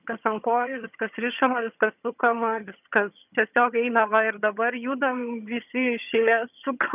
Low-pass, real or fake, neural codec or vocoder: 3.6 kHz; fake; vocoder, 22.05 kHz, 80 mel bands, HiFi-GAN